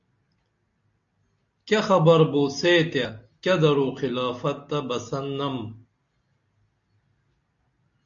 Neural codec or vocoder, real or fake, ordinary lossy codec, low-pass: none; real; AAC, 64 kbps; 7.2 kHz